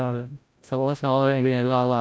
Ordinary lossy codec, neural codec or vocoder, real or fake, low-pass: none; codec, 16 kHz, 0.5 kbps, FreqCodec, larger model; fake; none